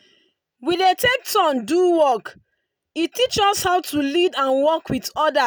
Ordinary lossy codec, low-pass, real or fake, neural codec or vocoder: none; none; real; none